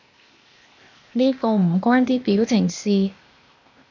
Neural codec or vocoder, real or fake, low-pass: codec, 16 kHz, 0.8 kbps, ZipCodec; fake; 7.2 kHz